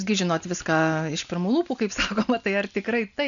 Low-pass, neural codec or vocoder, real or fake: 7.2 kHz; none; real